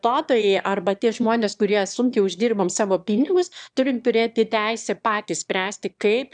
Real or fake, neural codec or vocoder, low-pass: fake; autoencoder, 22.05 kHz, a latent of 192 numbers a frame, VITS, trained on one speaker; 9.9 kHz